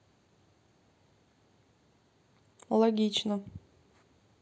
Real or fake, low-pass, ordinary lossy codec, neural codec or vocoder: real; none; none; none